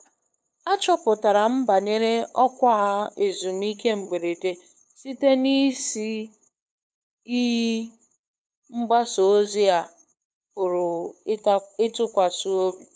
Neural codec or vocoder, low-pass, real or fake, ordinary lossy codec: codec, 16 kHz, 8 kbps, FunCodec, trained on LibriTTS, 25 frames a second; none; fake; none